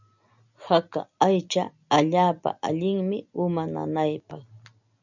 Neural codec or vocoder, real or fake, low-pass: none; real; 7.2 kHz